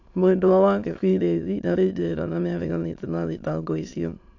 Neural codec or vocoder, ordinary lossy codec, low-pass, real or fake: autoencoder, 22.05 kHz, a latent of 192 numbers a frame, VITS, trained on many speakers; AAC, 48 kbps; 7.2 kHz; fake